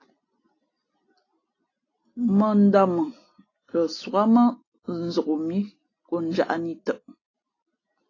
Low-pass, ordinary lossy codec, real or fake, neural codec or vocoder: 7.2 kHz; AAC, 32 kbps; real; none